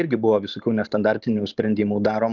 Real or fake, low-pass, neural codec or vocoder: real; 7.2 kHz; none